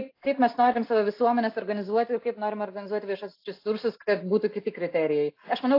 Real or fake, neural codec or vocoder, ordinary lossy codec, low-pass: real; none; AAC, 32 kbps; 5.4 kHz